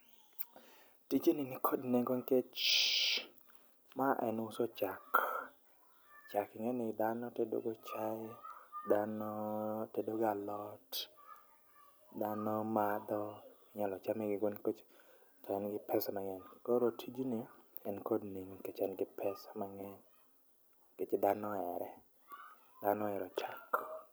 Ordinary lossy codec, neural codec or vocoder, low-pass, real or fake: none; none; none; real